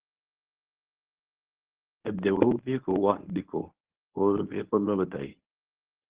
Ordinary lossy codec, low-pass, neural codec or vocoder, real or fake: Opus, 16 kbps; 3.6 kHz; codec, 24 kHz, 0.9 kbps, WavTokenizer, medium speech release version 1; fake